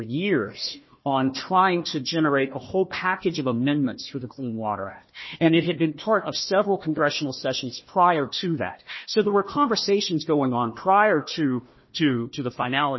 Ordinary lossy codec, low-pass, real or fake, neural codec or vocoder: MP3, 24 kbps; 7.2 kHz; fake; codec, 16 kHz, 1 kbps, FunCodec, trained on Chinese and English, 50 frames a second